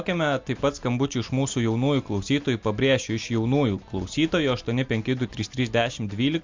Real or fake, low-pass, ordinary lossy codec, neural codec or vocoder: real; 7.2 kHz; MP3, 64 kbps; none